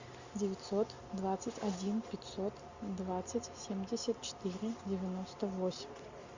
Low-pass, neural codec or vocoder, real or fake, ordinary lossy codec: 7.2 kHz; none; real; Opus, 64 kbps